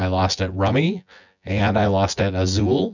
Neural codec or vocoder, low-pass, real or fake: vocoder, 24 kHz, 100 mel bands, Vocos; 7.2 kHz; fake